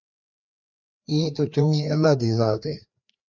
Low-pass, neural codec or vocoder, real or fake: 7.2 kHz; codec, 16 kHz, 2 kbps, FreqCodec, larger model; fake